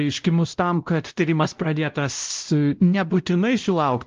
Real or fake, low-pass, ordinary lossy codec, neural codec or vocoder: fake; 7.2 kHz; Opus, 32 kbps; codec, 16 kHz, 0.5 kbps, X-Codec, WavLM features, trained on Multilingual LibriSpeech